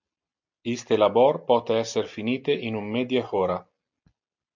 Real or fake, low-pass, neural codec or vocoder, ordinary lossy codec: real; 7.2 kHz; none; MP3, 64 kbps